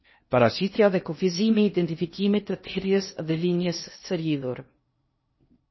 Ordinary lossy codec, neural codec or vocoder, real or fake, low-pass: MP3, 24 kbps; codec, 16 kHz in and 24 kHz out, 0.6 kbps, FocalCodec, streaming, 2048 codes; fake; 7.2 kHz